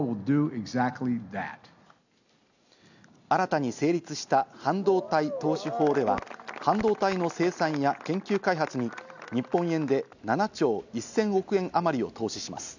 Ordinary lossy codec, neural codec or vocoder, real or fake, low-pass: none; none; real; 7.2 kHz